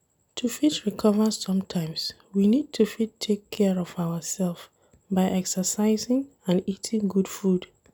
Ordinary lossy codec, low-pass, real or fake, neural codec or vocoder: none; none; real; none